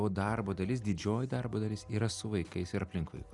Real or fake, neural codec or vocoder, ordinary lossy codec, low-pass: real; none; Opus, 64 kbps; 10.8 kHz